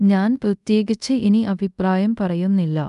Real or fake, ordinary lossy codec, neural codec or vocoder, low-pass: fake; none; codec, 24 kHz, 0.5 kbps, DualCodec; 10.8 kHz